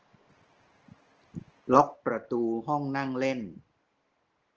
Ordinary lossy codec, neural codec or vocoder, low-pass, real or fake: Opus, 16 kbps; none; 7.2 kHz; real